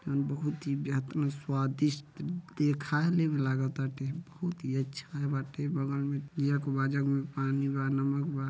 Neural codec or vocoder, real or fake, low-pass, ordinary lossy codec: none; real; none; none